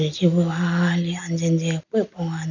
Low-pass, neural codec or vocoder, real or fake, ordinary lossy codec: 7.2 kHz; none; real; none